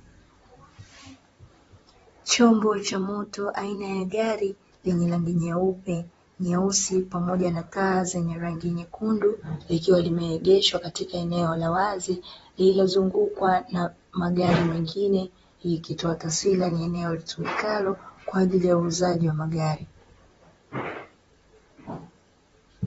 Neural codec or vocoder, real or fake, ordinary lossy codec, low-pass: vocoder, 44.1 kHz, 128 mel bands, Pupu-Vocoder; fake; AAC, 24 kbps; 19.8 kHz